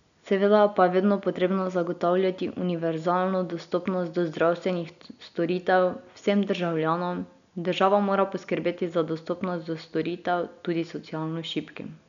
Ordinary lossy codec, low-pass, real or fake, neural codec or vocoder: none; 7.2 kHz; real; none